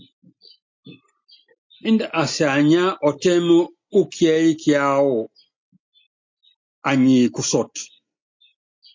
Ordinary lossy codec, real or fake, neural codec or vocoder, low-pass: MP3, 64 kbps; real; none; 7.2 kHz